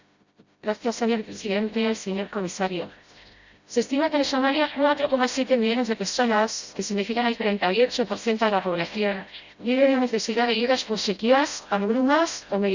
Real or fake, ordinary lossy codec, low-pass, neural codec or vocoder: fake; Opus, 64 kbps; 7.2 kHz; codec, 16 kHz, 0.5 kbps, FreqCodec, smaller model